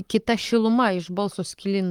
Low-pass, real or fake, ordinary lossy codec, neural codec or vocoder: 19.8 kHz; fake; Opus, 32 kbps; codec, 44.1 kHz, 7.8 kbps, Pupu-Codec